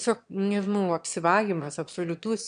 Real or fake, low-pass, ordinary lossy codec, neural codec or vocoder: fake; 9.9 kHz; AAC, 96 kbps; autoencoder, 22.05 kHz, a latent of 192 numbers a frame, VITS, trained on one speaker